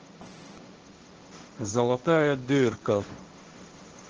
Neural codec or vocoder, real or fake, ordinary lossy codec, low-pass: codec, 16 kHz, 1.1 kbps, Voila-Tokenizer; fake; Opus, 16 kbps; 7.2 kHz